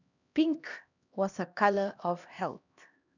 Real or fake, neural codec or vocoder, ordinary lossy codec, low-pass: fake; codec, 16 kHz, 1 kbps, X-Codec, HuBERT features, trained on LibriSpeech; none; 7.2 kHz